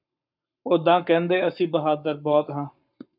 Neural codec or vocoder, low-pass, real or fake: codec, 44.1 kHz, 7.8 kbps, Pupu-Codec; 5.4 kHz; fake